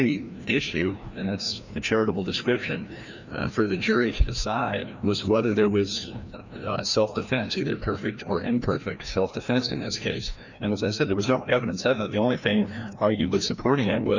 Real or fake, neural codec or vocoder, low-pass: fake; codec, 16 kHz, 1 kbps, FreqCodec, larger model; 7.2 kHz